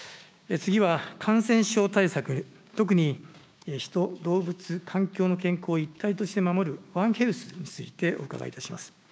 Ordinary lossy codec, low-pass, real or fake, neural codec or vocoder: none; none; fake; codec, 16 kHz, 6 kbps, DAC